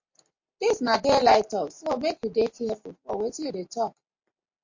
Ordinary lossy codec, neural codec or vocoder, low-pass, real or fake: MP3, 48 kbps; none; 7.2 kHz; real